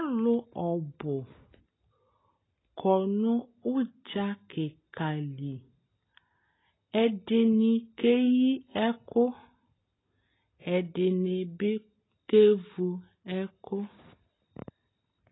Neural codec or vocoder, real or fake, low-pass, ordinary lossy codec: none; real; 7.2 kHz; AAC, 16 kbps